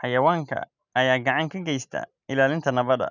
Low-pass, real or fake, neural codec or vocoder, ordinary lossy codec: 7.2 kHz; real; none; none